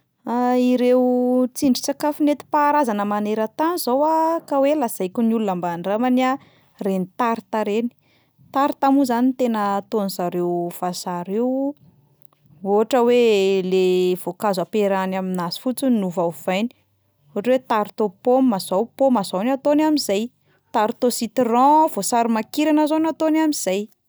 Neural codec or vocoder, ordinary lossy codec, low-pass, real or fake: none; none; none; real